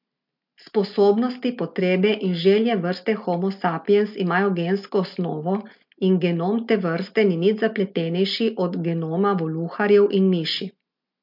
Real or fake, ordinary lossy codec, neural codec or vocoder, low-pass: real; MP3, 48 kbps; none; 5.4 kHz